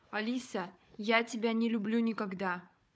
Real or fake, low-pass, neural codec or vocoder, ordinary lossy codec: fake; none; codec, 16 kHz, 4 kbps, FunCodec, trained on Chinese and English, 50 frames a second; none